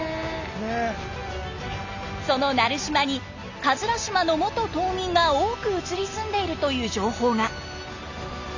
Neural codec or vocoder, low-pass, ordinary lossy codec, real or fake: none; 7.2 kHz; Opus, 64 kbps; real